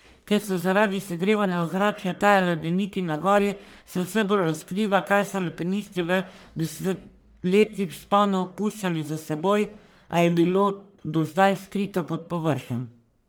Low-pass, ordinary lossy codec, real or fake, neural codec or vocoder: none; none; fake; codec, 44.1 kHz, 1.7 kbps, Pupu-Codec